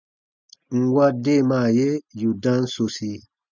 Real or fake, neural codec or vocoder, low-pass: real; none; 7.2 kHz